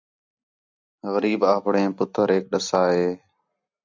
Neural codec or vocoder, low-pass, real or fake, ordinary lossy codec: none; 7.2 kHz; real; MP3, 64 kbps